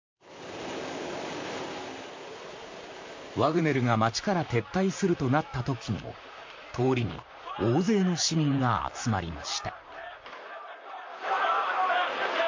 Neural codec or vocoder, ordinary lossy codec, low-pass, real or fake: vocoder, 44.1 kHz, 128 mel bands, Pupu-Vocoder; MP3, 48 kbps; 7.2 kHz; fake